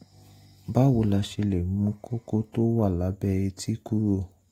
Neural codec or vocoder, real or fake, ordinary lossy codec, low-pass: none; real; AAC, 48 kbps; 19.8 kHz